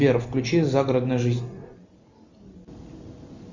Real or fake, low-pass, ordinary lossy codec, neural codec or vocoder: real; 7.2 kHz; Opus, 64 kbps; none